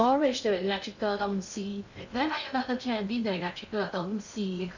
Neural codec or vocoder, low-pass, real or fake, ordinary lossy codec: codec, 16 kHz in and 24 kHz out, 0.6 kbps, FocalCodec, streaming, 2048 codes; 7.2 kHz; fake; none